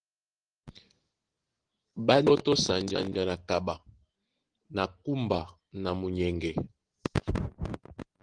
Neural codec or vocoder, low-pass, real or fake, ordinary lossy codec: none; 9.9 kHz; real; Opus, 16 kbps